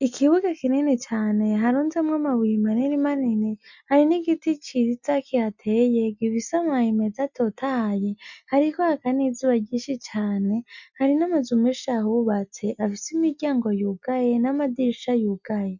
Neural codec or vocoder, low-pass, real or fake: none; 7.2 kHz; real